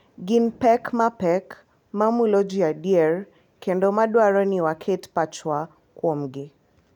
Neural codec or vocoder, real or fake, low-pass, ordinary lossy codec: none; real; 19.8 kHz; none